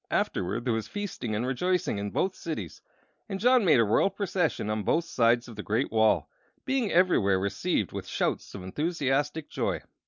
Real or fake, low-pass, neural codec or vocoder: real; 7.2 kHz; none